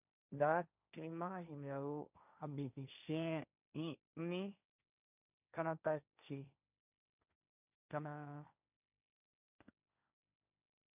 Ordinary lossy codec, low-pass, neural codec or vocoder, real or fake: none; 3.6 kHz; codec, 16 kHz, 1.1 kbps, Voila-Tokenizer; fake